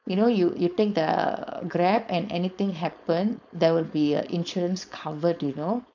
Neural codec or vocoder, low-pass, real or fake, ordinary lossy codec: codec, 16 kHz, 4.8 kbps, FACodec; 7.2 kHz; fake; none